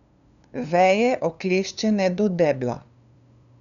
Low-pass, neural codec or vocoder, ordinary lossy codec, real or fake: 7.2 kHz; codec, 16 kHz, 2 kbps, FunCodec, trained on LibriTTS, 25 frames a second; none; fake